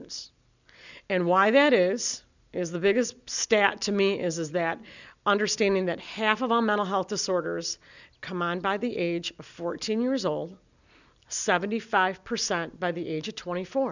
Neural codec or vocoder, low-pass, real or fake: none; 7.2 kHz; real